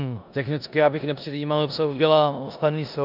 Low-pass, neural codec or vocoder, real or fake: 5.4 kHz; codec, 16 kHz in and 24 kHz out, 0.9 kbps, LongCat-Audio-Codec, four codebook decoder; fake